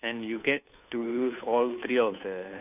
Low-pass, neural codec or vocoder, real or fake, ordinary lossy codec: 3.6 kHz; codec, 16 kHz, 2 kbps, FunCodec, trained on Chinese and English, 25 frames a second; fake; none